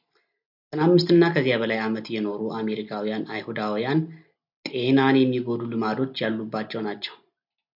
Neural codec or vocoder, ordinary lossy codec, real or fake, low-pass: none; AAC, 48 kbps; real; 5.4 kHz